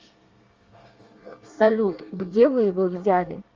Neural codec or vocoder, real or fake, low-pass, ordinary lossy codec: codec, 24 kHz, 1 kbps, SNAC; fake; 7.2 kHz; Opus, 32 kbps